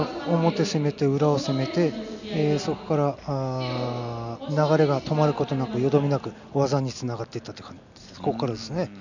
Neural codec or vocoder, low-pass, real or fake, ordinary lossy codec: none; 7.2 kHz; real; none